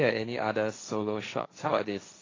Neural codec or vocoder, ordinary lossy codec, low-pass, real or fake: codec, 16 kHz, 1.1 kbps, Voila-Tokenizer; AAC, 32 kbps; 7.2 kHz; fake